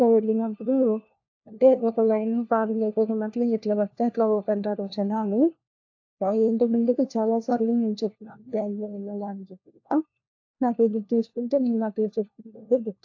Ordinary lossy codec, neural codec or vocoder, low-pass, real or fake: AAC, 48 kbps; codec, 16 kHz, 1 kbps, FunCodec, trained on LibriTTS, 50 frames a second; 7.2 kHz; fake